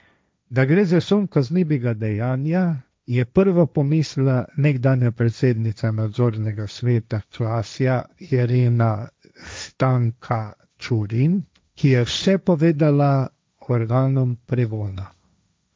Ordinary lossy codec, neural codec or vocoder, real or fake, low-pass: none; codec, 16 kHz, 1.1 kbps, Voila-Tokenizer; fake; none